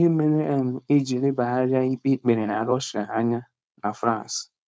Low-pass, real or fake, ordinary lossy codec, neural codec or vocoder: none; fake; none; codec, 16 kHz, 4.8 kbps, FACodec